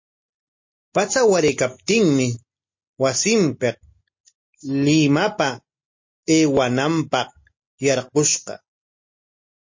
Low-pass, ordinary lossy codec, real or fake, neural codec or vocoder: 7.2 kHz; MP3, 32 kbps; real; none